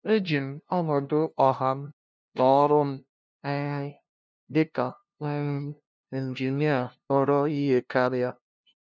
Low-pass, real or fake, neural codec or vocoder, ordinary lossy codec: none; fake; codec, 16 kHz, 0.5 kbps, FunCodec, trained on LibriTTS, 25 frames a second; none